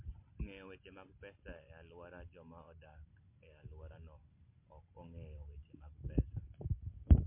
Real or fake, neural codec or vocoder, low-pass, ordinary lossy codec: real; none; 3.6 kHz; MP3, 24 kbps